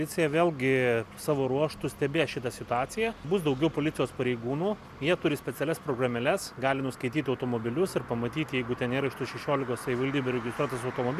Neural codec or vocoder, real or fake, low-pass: none; real; 14.4 kHz